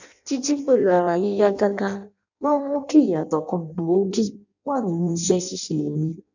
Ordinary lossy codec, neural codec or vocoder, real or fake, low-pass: none; codec, 16 kHz in and 24 kHz out, 0.6 kbps, FireRedTTS-2 codec; fake; 7.2 kHz